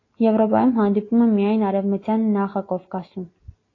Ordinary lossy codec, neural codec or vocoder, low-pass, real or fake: AAC, 32 kbps; none; 7.2 kHz; real